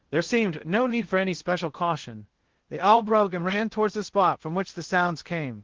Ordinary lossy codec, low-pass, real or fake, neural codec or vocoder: Opus, 16 kbps; 7.2 kHz; fake; codec, 16 kHz in and 24 kHz out, 0.6 kbps, FocalCodec, streaming, 2048 codes